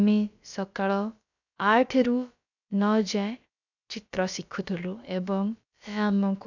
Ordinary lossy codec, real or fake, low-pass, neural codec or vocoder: none; fake; 7.2 kHz; codec, 16 kHz, about 1 kbps, DyCAST, with the encoder's durations